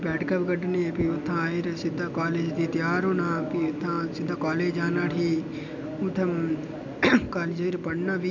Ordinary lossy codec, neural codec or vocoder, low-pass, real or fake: MP3, 64 kbps; none; 7.2 kHz; real